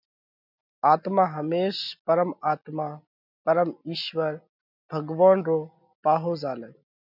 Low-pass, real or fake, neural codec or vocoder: 5.4 kHz; real; none